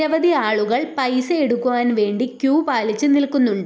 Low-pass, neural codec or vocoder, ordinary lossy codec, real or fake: none; none; none; real